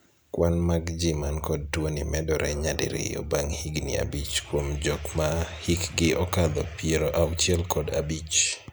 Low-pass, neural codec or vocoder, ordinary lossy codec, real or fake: none; none; none; real